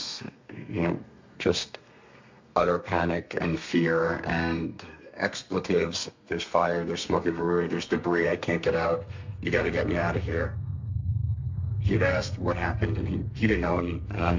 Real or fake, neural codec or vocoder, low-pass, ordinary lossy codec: fake; codec, 32 kHz, 1.9 kbps, SNAC; 7.2 kHz; MP3, 48 kbps